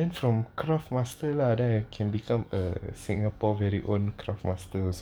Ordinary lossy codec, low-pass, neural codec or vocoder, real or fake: none; none; codec, 44.1 kHz, 7.8 kbps, DAC; fake